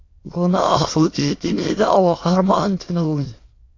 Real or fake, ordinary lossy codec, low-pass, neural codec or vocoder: fake; AAC, 32 kbps; 7.2 kHz; autoencoder, 22.05 kHz, a latent of 192 numbers a frame, VITS, trained on many speakers